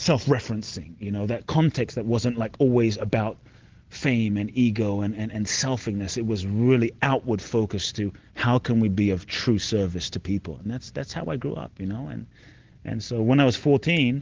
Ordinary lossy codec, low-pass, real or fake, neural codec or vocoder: Opus, 16 kbps; 7.2 kHz; real; none